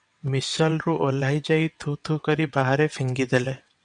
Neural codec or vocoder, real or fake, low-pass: vocoder, 22.05 kHz, 80 mel bands, WaveNeXt; fake; 9.9 kHz